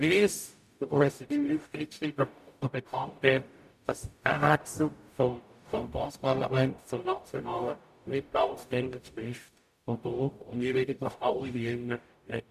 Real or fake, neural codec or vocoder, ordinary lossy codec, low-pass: fake; codec, 44.1 kHz, 0.9 kbps, DAC; none; 14.4 kHz